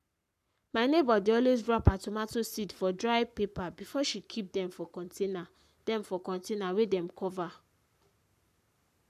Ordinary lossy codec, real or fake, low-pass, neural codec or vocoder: none; fake; 14.4 kHz; codec, 44.1 kHz, 7.8 kbps, Pupu-Codec